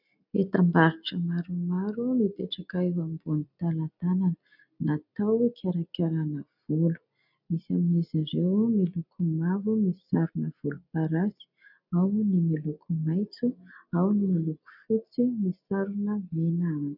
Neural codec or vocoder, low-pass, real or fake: none; 5.4 kHz; real